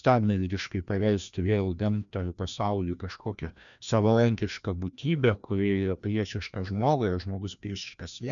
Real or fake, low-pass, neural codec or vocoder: fake; 7.2 kHz; codec, 16 kHz, 1 kbps, FreqCodec, larger model